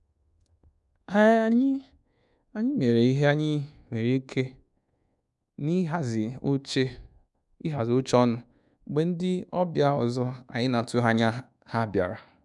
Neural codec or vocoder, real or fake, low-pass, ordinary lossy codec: codec, 24 kHz, 1.2 kbps, DualCodec; fake; 10.8 kHz; none